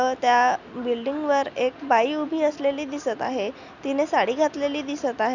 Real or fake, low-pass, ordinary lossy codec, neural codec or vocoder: real; 7.2 kHz; none; none